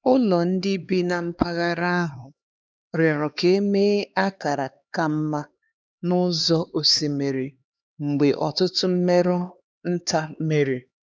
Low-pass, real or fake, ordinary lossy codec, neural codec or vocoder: none; fake; none; codec, 16 kHz, 2 kbps, X-Codec, HuBERT features, trained on LibriSpeech